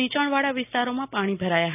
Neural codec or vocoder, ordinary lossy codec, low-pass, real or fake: none; none; 3.6 kHz; real